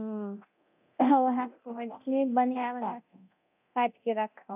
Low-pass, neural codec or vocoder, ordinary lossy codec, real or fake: 3.6 kHz; codec, 24 kHz, 0.9 kbps, DualCodec; AAC, 32 kbps; fake